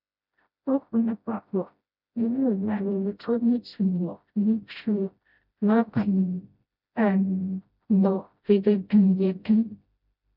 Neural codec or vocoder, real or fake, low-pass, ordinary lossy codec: codec, 16 kHz, 0.5 kbps, FreqCodec, smaller model; fake; 5.4 kHz; none